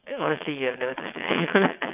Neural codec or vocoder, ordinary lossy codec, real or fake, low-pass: vocoder, 22.05 kHz, 80 mel bands, WaveNeXt; none; fake; 3.6 kHz